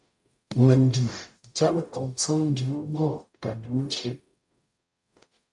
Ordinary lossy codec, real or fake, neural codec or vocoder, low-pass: MP3, 64 kbps; fake; codec, 44.1 kHz, 0.9 kbps, DAC; 10.8 kHz